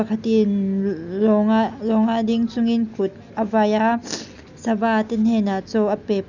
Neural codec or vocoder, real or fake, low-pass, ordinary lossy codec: none; real; 7.2 kHz; none